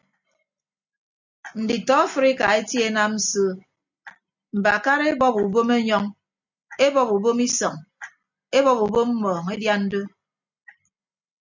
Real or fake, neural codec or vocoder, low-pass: real; none; 7.2 kHz